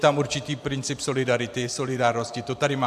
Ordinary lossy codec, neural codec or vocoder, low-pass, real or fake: AAC, 96 kbps; vocoder, 44.1 kHz, 128 mel bands every 512 samples, BigVGAN v2; 14.4 kHz; fake